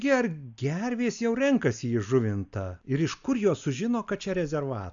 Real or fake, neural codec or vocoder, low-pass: real; none; 7.2 kHz